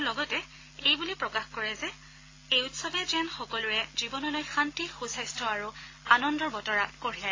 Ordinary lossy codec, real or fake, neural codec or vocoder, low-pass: AAC, 32 kbps; real; none; 7.2 kHz